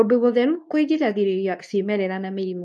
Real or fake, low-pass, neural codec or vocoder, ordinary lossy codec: fake; none; codec, 24 kHz, 0.9 kbps, WavTokenizer, medium speech release version 2; none